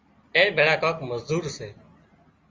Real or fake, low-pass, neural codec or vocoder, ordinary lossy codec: real; 7.2 kHz; none; Opus, 32 kbps